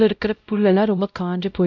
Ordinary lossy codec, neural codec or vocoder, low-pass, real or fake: none; codec, 16 kHz, 0.5 kbps, X-Codec, WavLM features, trained on Multilingual LibriSpeech; 7.2 kHz; fake